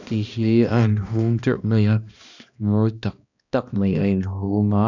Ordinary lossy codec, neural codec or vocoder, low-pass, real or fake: none; codec, 16 kHz, 1 kbps, X-Codec, HuBERT features, trained on balanced general audio; 7.2 kHz; fake